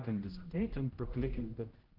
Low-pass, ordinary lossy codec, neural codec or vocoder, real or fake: 5.4 kHz; Opus, 16 kbps; codec, 16 kHz, 0.5 kbps, X-Codec, HuBERT features, trained on general audio; fake